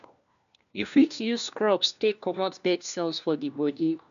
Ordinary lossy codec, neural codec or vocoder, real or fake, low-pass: none; codec, 16 kHz, 1 kbps, FunCodec, trained on LibriTTS, 50 frames a second; fake; 7.2 kHz